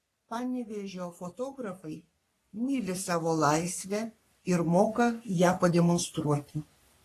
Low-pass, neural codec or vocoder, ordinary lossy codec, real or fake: 14.4 kHz; codec, 44.1 kHz, 3.4 kbps, Pupu-Codec; AAC, 48 kbps; fake